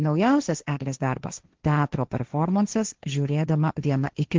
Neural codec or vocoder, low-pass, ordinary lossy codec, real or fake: codec, 16 kHz, 1.1 kbps, Voila-Tokenizer; 7.2 kHz; Opus, 16 kbps; fake